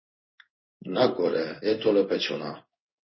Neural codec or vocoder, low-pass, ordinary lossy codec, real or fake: codec, 16 kHz in and 24 kHz out, 1 kbps, XY-Tokenizer; 7.2 kHz; MP3, 24 kbps; fake